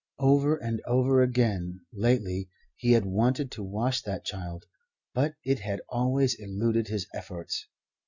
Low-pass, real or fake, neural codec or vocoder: 7.2 kHz; real; none